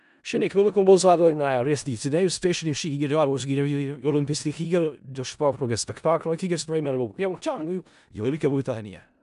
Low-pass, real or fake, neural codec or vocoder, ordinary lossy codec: 10.8 kHz; fake; codec, 16 kHz in and 24 kHz out, 0.4 kbps, LongCat-Audio-Codec, four codebook decoder; none